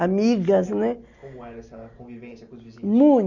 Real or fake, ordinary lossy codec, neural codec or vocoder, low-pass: real; none; none; 7.2 kHz